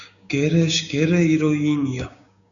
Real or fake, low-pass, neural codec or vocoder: fake; 7.2 kHz; codec, 16 kHz, 6 kbps, DAC